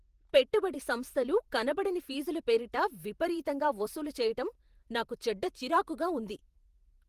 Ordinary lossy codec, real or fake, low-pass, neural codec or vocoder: Opus, 24 kbps; fake; 14.4 kHz; vocoder, 48 kHz, 128 mel bands, Vocos